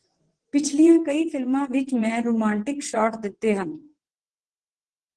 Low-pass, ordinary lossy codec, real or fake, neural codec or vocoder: 9.9 kHz; Opus, 16 kbps; fake; vocoder, 22.05 kHz, 80 mel bands, WaveNeXt